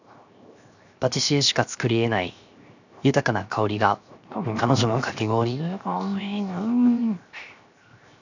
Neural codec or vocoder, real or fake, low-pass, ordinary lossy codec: codec, 16 kHz, 0.7 kbps, FocalCodec; fake; 7.2 kHz; none